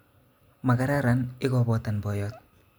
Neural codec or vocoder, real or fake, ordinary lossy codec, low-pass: none; real; none; none